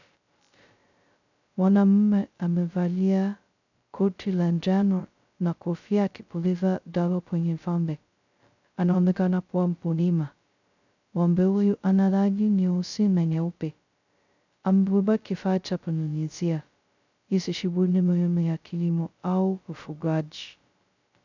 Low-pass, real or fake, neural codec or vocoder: 7.2 kHz; fake; codec, 16 kHz, 0.2 kbps, FocalCodec